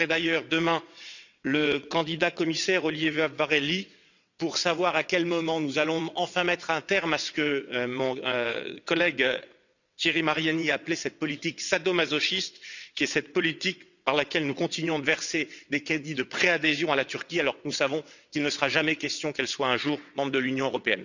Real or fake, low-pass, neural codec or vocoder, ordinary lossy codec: fake; 7.2 kHz; vocoder, 22.05 kHz, 80 mel bands, WaveNeXt; none